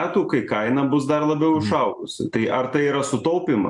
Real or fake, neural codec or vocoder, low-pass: real; none; 10.8 kHz